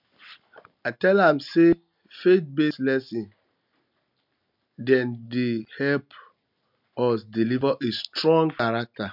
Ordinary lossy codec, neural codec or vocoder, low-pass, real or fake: none; none; 5.4 kHz; real